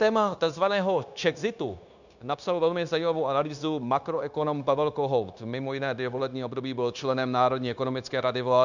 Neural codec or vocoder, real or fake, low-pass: codec, 16 kHz, 0.9 kbps, LongCat-Audio-Codec; fake; 7.2 kHz